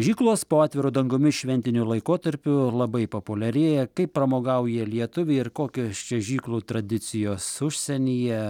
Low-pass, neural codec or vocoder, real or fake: 19.8 kHz; none; real